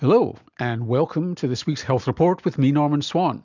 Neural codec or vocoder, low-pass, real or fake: none; 7.2 kHz; real